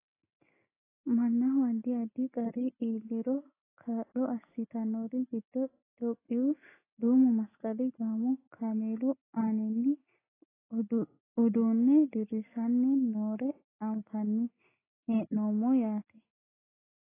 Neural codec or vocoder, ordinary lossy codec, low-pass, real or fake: none; AAC, 16 kbps; 3.6 kHz; real